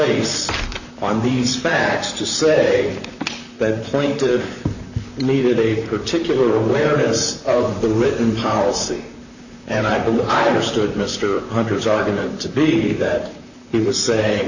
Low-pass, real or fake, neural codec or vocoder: 7.2 kHz; fake; vocoder, 44.1 kHz, 128 mel bands, Pupu-Vocoder